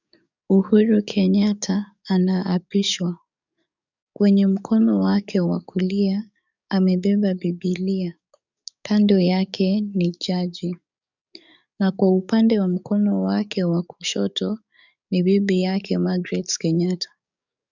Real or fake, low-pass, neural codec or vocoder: fake; 7.2 kHz; codec, 44.1 kHz, 7.8 kbps, DAC